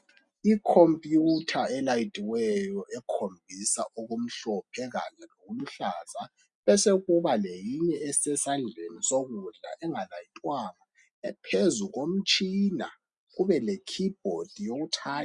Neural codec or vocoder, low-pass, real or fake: none; 10.8 kHz; real